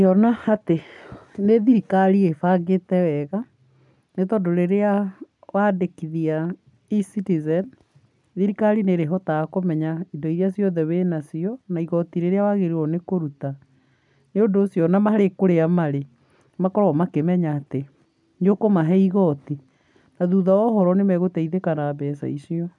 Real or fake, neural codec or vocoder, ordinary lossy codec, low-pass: real; none; none; 10.8 kHz